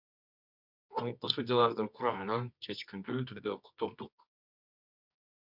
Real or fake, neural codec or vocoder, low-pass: fake; codec, 24 kHz, 0.9 kbps, WavTokenizer, medium music audio release; 5.4 kHz